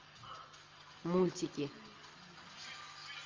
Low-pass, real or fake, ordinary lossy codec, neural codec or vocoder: 7.2 kHz; real; Opus, 24 kbps; none